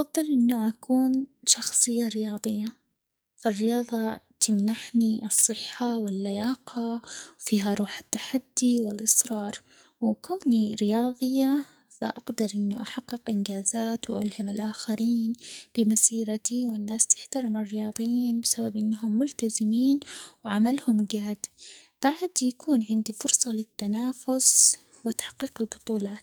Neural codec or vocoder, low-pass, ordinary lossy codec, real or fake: codec, 44.1 kHz, 2.6 kbps, SNAC; none; none; fake